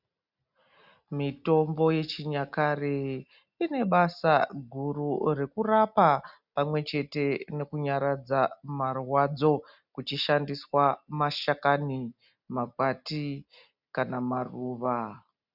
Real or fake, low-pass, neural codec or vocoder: real; 5.4 kHz; none